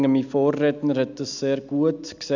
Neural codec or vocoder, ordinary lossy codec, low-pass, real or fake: none; none; 7.2 kHz; real